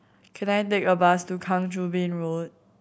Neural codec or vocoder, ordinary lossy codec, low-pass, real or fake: none; none; none; real